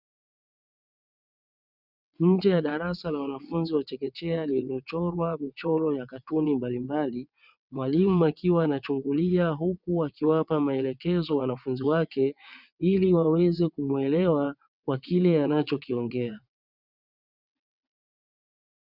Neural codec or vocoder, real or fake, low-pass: vocoder, 22.05 kHz, 80 mel bands, WaveNeXt; fake; 5.4 kHz